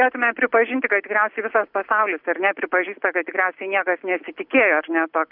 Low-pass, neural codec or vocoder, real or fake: 5.4 kHz; none; real